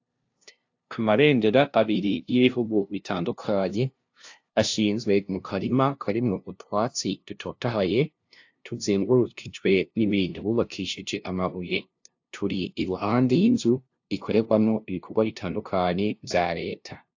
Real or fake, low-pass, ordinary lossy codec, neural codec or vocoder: fake; 7.2 kHz; AAC, 48 kbps; codec, 16 kHz, 0.5 kbps, FunCodec, trained on LibriTTS, 25 frames a second